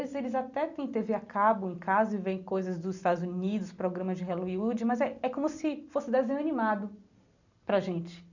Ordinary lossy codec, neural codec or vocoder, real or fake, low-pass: none; none; real; 7.2 kHz